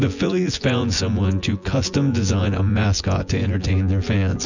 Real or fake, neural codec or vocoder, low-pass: fake; vocoder, 24 kHz, 100 mel bands, Vocos; 7.2 kHz